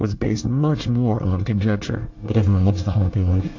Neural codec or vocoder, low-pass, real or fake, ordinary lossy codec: codec, 24 kHz, 1 kbps, SNAC; 7.2 kHz; fake; AAC, 48 kbps